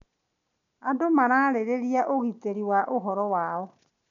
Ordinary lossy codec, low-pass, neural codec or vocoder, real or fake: none; 7.2 kHz; none; real